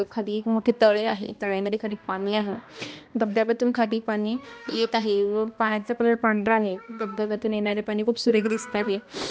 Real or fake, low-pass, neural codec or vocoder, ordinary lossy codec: fake; none; codec, 16 kHz, 1 kbps, X-Codec, HuBERT features, trained on balanced general audio; none